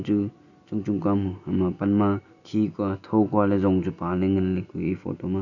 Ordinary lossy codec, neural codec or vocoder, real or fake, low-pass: none; none; real; 7.2 kHz